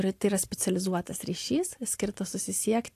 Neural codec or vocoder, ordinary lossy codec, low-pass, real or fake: vocoder, 44.1 kHz, 128 mel bands every 512 samples, BigVGAN v2; AAC, 64 kbps; 14.4 kHz; fake